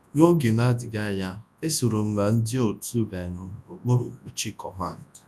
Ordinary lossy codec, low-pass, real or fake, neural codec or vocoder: none; none; fake; codec, 24 kHz, 0.9 kbps, WavTokenizer, large speech release